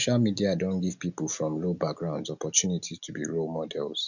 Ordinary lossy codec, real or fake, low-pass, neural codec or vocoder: none; real; 7.2 kHz; none